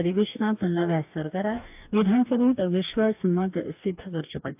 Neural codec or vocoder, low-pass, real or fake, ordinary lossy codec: codec, 44.1 kHz, 2.6 kbps, DAC; 3.6 kHz; fake; AAC, 32 kbps